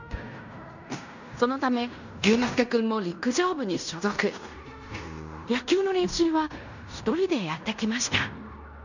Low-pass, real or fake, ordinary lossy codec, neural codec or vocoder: 7.2 kHz; fake; none; codec, 16 kHz in and 24 kHz out, 0.9 kbps, LongCat-Audio-Codec, fine tuned four codebook decoder